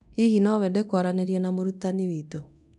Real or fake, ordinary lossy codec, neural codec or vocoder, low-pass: fake; none; codec, 24 kHz, 0.9 kbps, DualCodec; 10.8 kHz